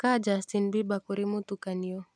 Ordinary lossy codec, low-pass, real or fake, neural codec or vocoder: none; none; real; none